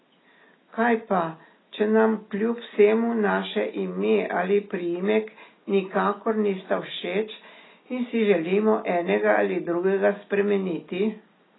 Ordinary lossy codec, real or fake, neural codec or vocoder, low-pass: AAC, 16 kbps; real; none; 7.2 kHz